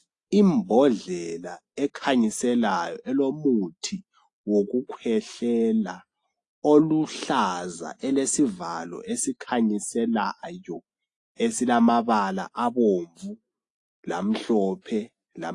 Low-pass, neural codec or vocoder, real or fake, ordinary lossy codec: 10.8 kHz; none; real; AAC, 48 kbps